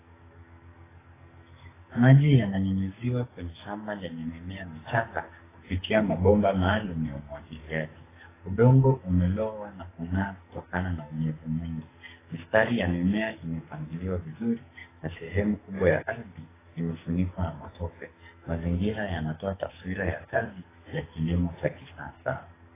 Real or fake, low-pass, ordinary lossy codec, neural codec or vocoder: fake; 3.6 kHz; AAC, 16 kbps; codec, 44.1 kHz, 2.6 kbps, SNAC